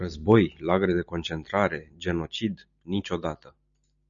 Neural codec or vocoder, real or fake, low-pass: none; real; 7.2 kHz